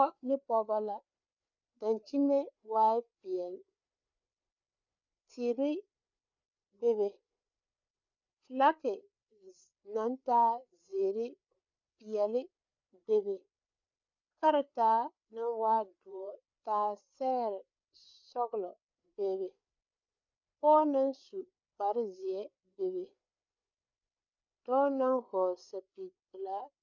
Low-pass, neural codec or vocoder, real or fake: 7.2 kHz; codec, 16 kHz, 4 kbps, FreqCodec, larger model; fake